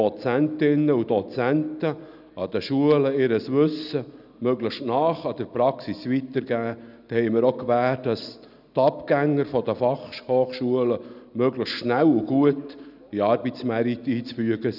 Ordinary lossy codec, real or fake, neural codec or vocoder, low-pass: none; real; none; 5.4 kHz